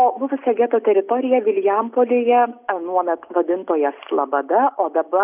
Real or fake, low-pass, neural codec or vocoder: real; 3.6 kHz; none